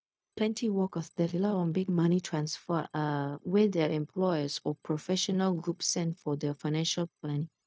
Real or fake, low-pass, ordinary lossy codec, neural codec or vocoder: fake; none; none; codec, 16 kHz, 0.4 kbps, LongCat-Audio-Codec